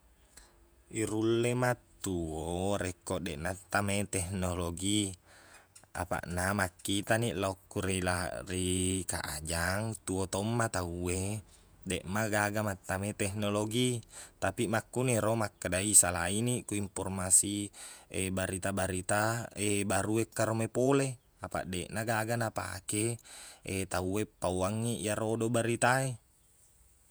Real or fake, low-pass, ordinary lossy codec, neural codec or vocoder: fake; none; none; vocoder, 48 kHz, 128 mel bands, Vocos